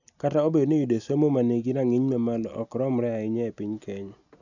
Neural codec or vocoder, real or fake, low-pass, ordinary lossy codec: none; real; 7.2 kHz; none